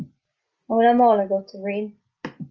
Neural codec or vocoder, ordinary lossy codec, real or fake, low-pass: none; Opus, 32 kbps; real; 7.2 kHz